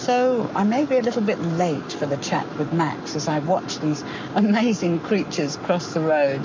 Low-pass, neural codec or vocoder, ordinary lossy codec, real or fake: 7.2 kHz; codec, 44.1 kHz, 7.8 kbps, Pupu-Codec; MP3, 64 kbps; fake